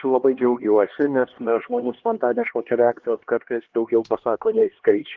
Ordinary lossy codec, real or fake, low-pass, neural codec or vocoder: Opus, 16 kbps; fake; 7.2 kHz; codec, 16 kHz, 2 kbps, X-Codec, HuBERT features, trained on LibriSpeech